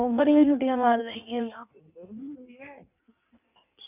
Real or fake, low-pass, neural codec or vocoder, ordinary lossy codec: fake; 3.6 kHz; codec, 16 kHz in and 24 kHz out, 1.1 kbps, FireRedTTS-2 codec; none